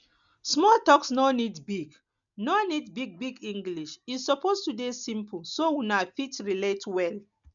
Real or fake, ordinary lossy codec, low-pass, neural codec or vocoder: real; none; 7.2 kHz; none